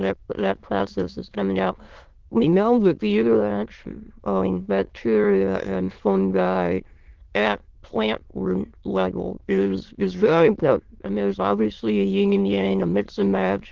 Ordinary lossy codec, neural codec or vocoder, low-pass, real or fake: Opus, 16 kbps; autoencoder, 22.05 kHz, a latent of 192 numbers a frame, VITS, trained on many speakers; 7.2 kHz; fake